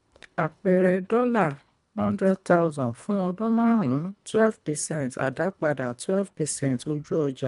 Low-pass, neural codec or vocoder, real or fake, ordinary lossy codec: 10.8 kHz; codec, 24 kHz, 1.5 kbps, HILCodec; fake; MP3, 96 kbps